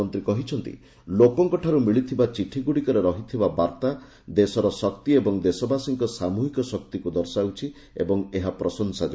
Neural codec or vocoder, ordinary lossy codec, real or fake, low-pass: none; none; real; none